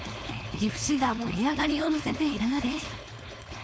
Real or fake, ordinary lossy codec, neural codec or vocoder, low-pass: fake; none; codec, 16 kHz, 4.8 kbps, FACodec; none